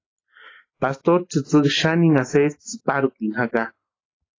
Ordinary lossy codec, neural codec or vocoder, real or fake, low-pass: AAC, 32 kbps; none; real; 7.2 kHz